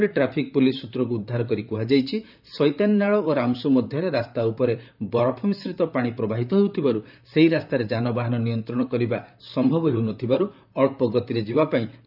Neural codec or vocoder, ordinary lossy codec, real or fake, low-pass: vocoder, 44.1 kHz, 128 mel bands, Pupu-Vocoder; none; fake; 5.4 kHz